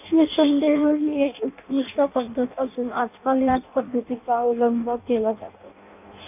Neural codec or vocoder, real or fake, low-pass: codec, 16 kHz in and 24 kHz out, 0.6 kbps, FireRedTTS-2 codec; fake; 3.6 kHz